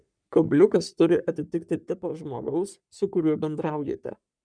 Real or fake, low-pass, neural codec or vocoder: fake; 9.9 kHz; codec, 44.1 kHz, 3.4 kbps, Pupu-Codec